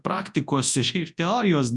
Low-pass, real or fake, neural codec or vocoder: 10.8 kHz; fake; codec, 24 kHz, 0.9 kbps, WavTokenizer, large speech release